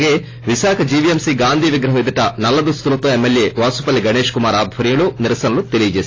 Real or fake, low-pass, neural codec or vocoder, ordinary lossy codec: real; 7.2 kHz; none; AAC, 32 kbps